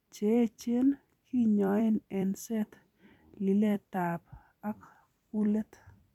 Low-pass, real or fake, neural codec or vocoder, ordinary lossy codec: 19.8 kHz; fake; vocoder, 44.1 kHz, 128 mel bands every 256 samples, BigVGAN v2; none